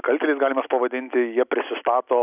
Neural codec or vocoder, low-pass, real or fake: none; 3.6 kHz; real